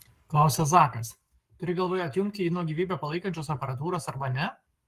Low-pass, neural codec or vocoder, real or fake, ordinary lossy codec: 14.4 kHz; codec, 44.1 kHz, 7.8 kbps, Pupu-Codec; fake; Opus, 32 kbps